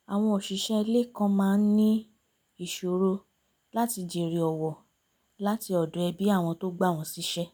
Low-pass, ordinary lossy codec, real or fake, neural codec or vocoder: none; none; real; none